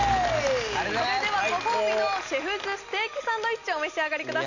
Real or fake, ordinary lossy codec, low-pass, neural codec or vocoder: real; none; 7.2 kHz; none